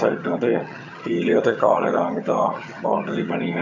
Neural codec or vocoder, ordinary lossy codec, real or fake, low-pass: vocoder, 22.05 kHz, 80 mel bands, HiFi-GAN; none; fake; 7.2 kHz